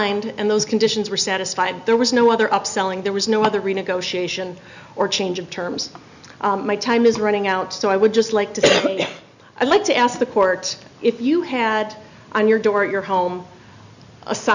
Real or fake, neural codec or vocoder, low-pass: real; none; 7.2 kHz